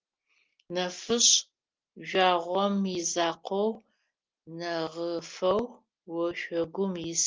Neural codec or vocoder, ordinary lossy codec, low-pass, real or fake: none; Opus, 24 kbps; 7.2 kHz; real